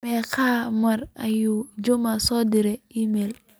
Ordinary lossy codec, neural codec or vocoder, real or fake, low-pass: none; none; real; none